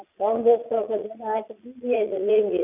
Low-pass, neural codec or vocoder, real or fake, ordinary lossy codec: 3.6 kHz; vocoder, 22.05 kHz, 80 mel bands, Vocos; fake; none